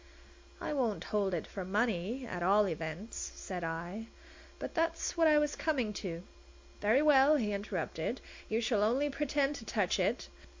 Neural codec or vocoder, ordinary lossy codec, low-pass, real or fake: none; MP3, 48 kbps; 7.2 kHz; real